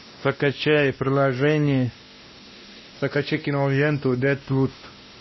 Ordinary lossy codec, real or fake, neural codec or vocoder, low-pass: MP3, 24 kbps; fake; codec, 16 kHz, 1 kbps, X-Codec, WavLM features, trained on Multilingual LibriSpeech; 7.2 kHz